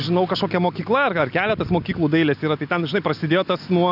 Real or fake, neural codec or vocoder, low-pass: real; none; 5.4 kHz